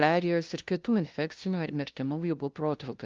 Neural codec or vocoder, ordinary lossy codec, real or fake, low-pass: codec, 16 kHz, 0.5 kbps, FunCodec, trained on LibriTTS, 25 frames a second; Opus, 32 kbps; fake; 7.2 kHz